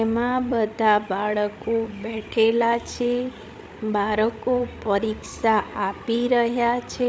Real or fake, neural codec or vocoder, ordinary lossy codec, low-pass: fake; codec, 16 kHz, 16 kbps, FreqCodec, larger model; none; none